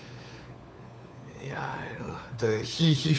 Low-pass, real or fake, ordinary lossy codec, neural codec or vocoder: none; fake; none; codec, 16 kHz, 4 kbps, FunCodec, trained on LibriTTS, 50 frames a second